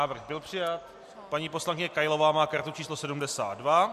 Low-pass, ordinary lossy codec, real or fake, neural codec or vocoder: 14.4 kHz; MP3, 64 kbps; real; none